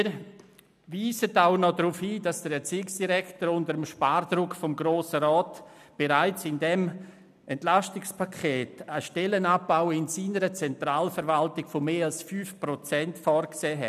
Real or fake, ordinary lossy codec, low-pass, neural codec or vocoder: real; none; 14.4 kHz; none